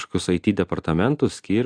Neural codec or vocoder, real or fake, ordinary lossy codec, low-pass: none; real; AAC, 64 kbps; 9.9 kHz